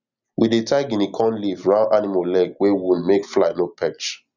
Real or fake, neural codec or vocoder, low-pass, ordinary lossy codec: real; none; 7.2 kHz; none